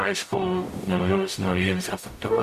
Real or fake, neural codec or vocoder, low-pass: fake; codec, 44.1 kHz, 0.9 kbps, DAC; 14.4 kHz